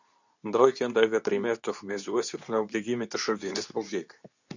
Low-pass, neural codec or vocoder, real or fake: 7.2 kHz; codec, 24 kHz, 0.9 kbps, WavTokenizer, medium speech release version 2; fake